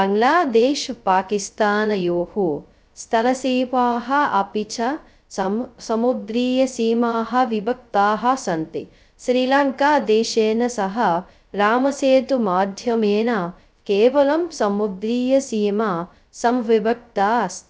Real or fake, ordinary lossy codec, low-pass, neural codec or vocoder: fake; none; none; codec, 16 kHz, 0.2 kbps, FocalCodec